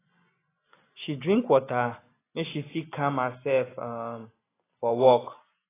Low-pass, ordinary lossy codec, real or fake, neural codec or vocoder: 3.6 kHz; AAC, 16 kbps; real; none